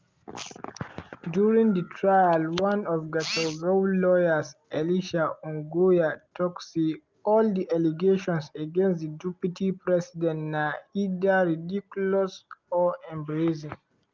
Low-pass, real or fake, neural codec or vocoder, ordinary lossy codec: 7.2 kHz; real; none; Opus, 32 kbps